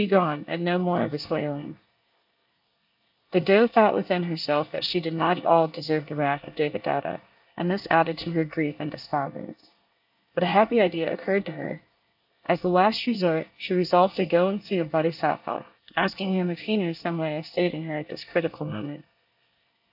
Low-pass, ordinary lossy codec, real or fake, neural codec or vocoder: 5.4 kHz; AAC, 48 kbps; fake; codec, 24 kHz, 1 kbps, SNAC